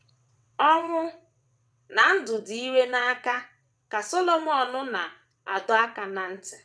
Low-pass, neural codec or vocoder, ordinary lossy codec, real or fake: none; vocoder, 22.05 kHz, 80 mel bands, WaveNeXt; none; fake